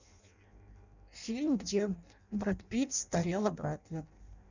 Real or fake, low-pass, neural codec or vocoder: fake; 7.2 kHz; codec, 16 kHz in and 24 kHz out, 0.6 kbps, FireRedTTS-2 codec